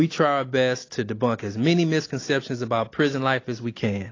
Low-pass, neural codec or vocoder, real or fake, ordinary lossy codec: 7.2 kHz; none; real; AAC, 32 kbps